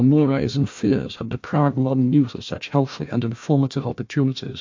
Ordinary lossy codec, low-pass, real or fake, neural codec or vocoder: MP3, 48 kbps; 7.2 kHz; fake; codec, 16 kHz, 1 kbps, FunCodec, trained on Chinese and English, 50 frames a second